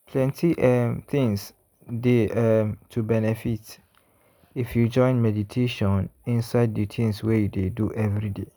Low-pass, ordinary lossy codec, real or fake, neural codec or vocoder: none; none; real; none